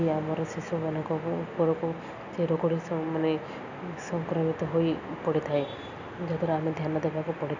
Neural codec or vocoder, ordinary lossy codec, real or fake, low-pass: none; none; real; 7.2 kHz